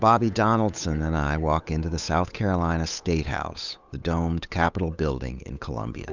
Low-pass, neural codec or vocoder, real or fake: 7.2 kHz; codec, 16 kHz, 8 kbps, FunCodec, trained on Chinese and English, 25 frames a second; fake